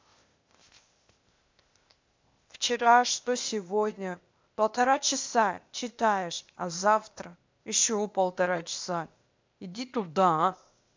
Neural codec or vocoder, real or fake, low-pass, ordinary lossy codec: codec, 16 kHz, 0.8 kbps, ZipCodec; fake; 7.2 kHz; MP3, 64 kbps